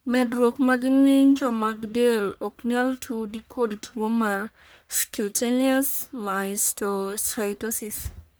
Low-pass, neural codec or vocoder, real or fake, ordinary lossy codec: none; codec, 44.1 kHz, 1.7 kbps, Pupu-Codec; fake; none